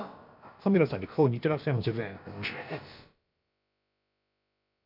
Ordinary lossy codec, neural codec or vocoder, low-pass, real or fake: none; codec, 16 kHz, about 1 kbps, DyCAST, with the encoder's durations; 5.4 kHz; fake